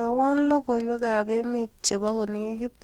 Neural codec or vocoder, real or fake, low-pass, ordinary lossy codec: codec, 44.1 kHz, 2.6 kbps, DAC; fake; 19.8 kHz; Opus, 32 kbps